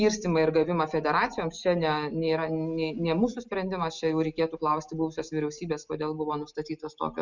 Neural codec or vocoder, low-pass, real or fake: none; 7.2 kHz; real